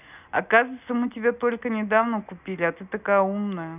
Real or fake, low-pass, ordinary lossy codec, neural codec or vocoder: real; 3.6 kHz; none; none